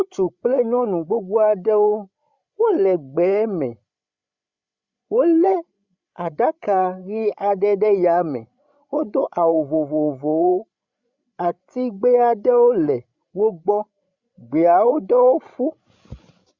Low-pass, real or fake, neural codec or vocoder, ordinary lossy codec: 7.2 kHz; fake; codec, 16 kHz, 16 kbps, FreqCodec, larger model; Opus, 64 kbps